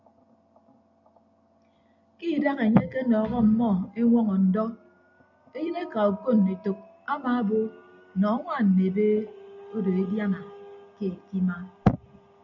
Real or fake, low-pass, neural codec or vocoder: real; 7.2 kHz; none